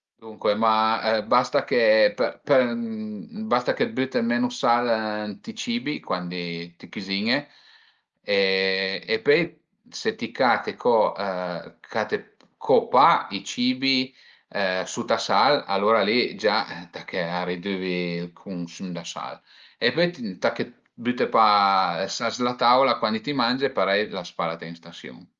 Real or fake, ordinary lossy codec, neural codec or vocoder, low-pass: real; Opus, 32 kbps; none; 7.2 kHz